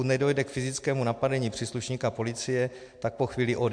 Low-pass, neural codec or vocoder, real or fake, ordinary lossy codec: 9.9 kHz; none; real; MP3, 64 kbps